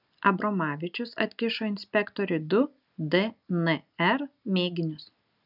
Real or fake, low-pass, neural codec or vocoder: real; 5.4 kHz; none